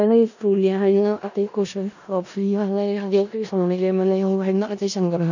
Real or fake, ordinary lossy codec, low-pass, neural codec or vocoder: fake; none; 7.2 kHz; codec, 16 kHz in and 24 kHz out, 0.4 kbps, LongCat-Audio-Codec, four codebook decoder